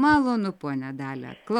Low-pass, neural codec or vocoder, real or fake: 19.8 kHz; none; real